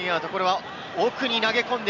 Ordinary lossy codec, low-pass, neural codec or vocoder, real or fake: none; 7.2 kHz; none; real